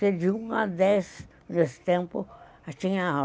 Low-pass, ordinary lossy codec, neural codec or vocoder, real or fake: none; none; none; real